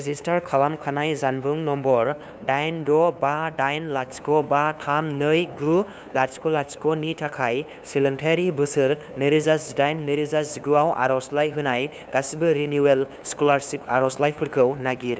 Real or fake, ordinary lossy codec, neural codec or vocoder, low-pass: fake; none; codec, 16 kHz, 2 kbps, FunCodec, trained on LibriTTS, 25 frames a second; none